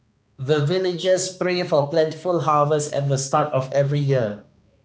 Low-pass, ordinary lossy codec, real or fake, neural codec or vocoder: none; none; fake; codec, 16 kHz, 2 kbps, X-Codec, HuBERT features, trained on balanced general audio